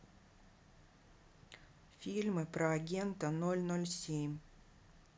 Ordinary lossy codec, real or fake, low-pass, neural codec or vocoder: none; real; none; none